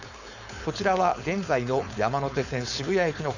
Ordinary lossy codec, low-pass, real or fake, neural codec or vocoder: none; 7.2 kHz; fake; codec, 16 kHz, 4.8 kbps, FACodec